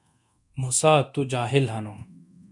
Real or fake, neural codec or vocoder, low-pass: fake; codec, 24 kHz, 0.9 kbps, DualCodec; 10.8 kHz